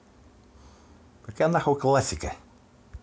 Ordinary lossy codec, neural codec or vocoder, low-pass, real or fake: none; none; none; real